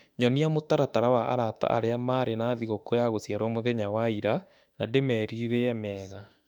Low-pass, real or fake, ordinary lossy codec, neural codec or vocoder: 19.8 kHz; fake; none; autoencoder, 48 kHz, 32 numbers a frame, DAC-VAE, trained on Japanese speech